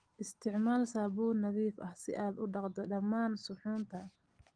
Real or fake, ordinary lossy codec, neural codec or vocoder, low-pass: real; Opus, 24 kbps; none; 9.9 kHz